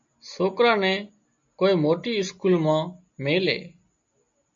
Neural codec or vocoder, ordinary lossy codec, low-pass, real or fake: none; MP3, 48 kbps; 7.2 kHz; real